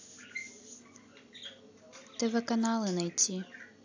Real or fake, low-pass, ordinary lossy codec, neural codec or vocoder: real; 7.2 kHz; AAC, 48 kbps; none